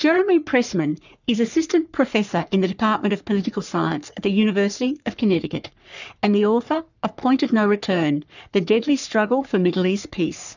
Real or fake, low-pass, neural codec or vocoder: fake; 7.2 kHz; codec, 44.1 kHz, 3.4 kbps, Pupu-Codec